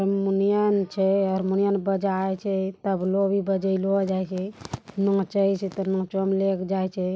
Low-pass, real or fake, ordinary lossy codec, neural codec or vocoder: none; real; none; none